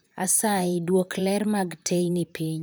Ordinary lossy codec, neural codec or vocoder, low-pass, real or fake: none; vocoder, 44.1 kHz, 128 mel bands, Pupu-Vocoder; none; fake